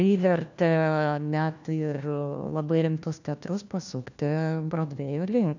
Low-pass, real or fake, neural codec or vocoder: 7.2 kHz; fake; codec, 16 kHz, 1 kbps, FunCodec, trained on LibriTTS, 50 frames a second